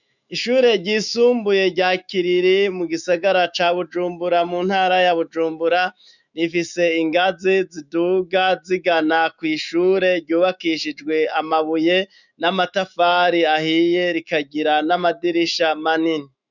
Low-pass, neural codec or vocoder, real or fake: 7.2 kHz; autoencoder, 48 kHz, 128 numbers a frame, DAC-VAE, trained on Japanese speech; fake